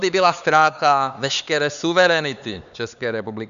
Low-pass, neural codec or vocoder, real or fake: 7.2 kHz; codec, 16 kHz, 4 kbps, X-Codec, HuBERT features, trained on LibriSpeech; fake